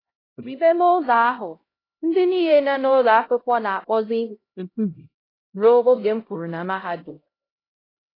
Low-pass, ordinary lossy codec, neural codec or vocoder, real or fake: 5.4 kHz; AAC, 24 kbps; codec, 16 kHz, 0.5 kbps, X-Codec, HuBERT features, trained on LibriSpeech; fake